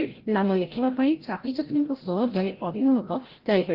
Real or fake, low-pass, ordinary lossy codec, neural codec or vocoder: fake; 5.4 kHz; Opus, 16 kbps; codec, 16 kHz, 0.5 kbps, FreqCodec, larger model